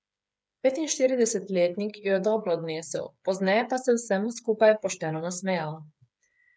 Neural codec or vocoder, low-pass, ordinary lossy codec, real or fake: codec, 16 kHz, 8 kbps, FreqCodec, smaller model; none; none; fake